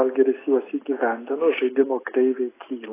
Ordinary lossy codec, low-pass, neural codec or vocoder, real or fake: AAC, 16 kbps; 3.6 kHz; none; real